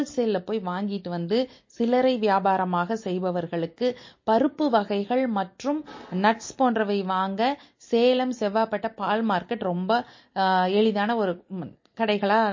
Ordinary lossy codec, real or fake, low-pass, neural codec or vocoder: MP3, 32 kbps; real; 7.2 kHz; none